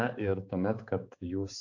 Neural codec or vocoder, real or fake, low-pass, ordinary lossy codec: codec, 16 kHz, 4 kbps, X-Codec, HuBERT features, trained on balanced general audio; fake; 7.2 kHz; AAC, 48 kbps